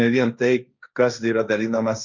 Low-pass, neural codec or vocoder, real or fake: 7.2 kHz; codec, 16 kHz, 1.1 kbps, Voila-Tokenizer; fake